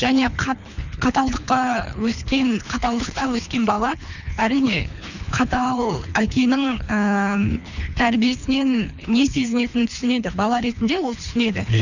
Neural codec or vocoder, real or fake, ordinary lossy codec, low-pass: codec, 24 kHz, 3 kbps, HILCodec; fake; none; 7.2 kHz